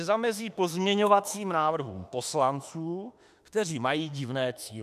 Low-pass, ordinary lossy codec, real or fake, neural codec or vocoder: 14.4 kHz; AAC, 96 kbps; fake; autoencoder, 48 kHz, 32 numbers a frame, DAC-VAE, trained on Japanese speech